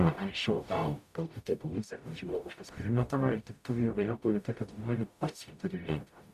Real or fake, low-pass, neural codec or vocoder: fake; 14.4 kHz; codec, 44.1 kHz, 0.9 kbps, DAC